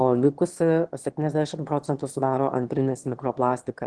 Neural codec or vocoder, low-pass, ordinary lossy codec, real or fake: autoencoder, 22.05 kHz, a latent of 192 numbers a frame, VITS, trained on one speaker; 9.9 kHz; Opus, 16 kbps; fake